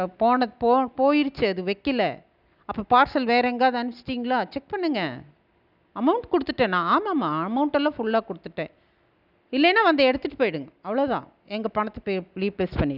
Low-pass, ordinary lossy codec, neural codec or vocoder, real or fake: 5.4 kHz; none; none; real